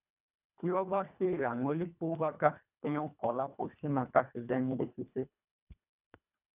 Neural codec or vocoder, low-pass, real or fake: codec, 24 kHz, 1.5 kbps, HILCodec; 3.6 kHz; fake